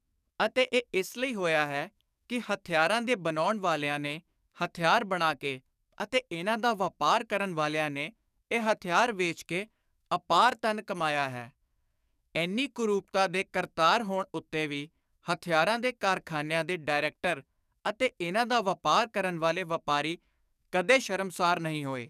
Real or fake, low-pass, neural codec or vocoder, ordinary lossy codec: fake; 14.4 kHz; codec, 44.1 kHz, 7.8 kbps, DAC; none